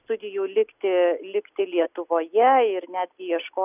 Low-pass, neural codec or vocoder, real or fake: 3.6 kHz; none; real